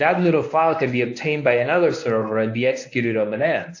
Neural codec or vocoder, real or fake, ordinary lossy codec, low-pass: codec, 24 kHz, 0.9 kbps, WavTokenizer, medium speech release version 1; fake; AAC, 48 kbps; 7.2 kHz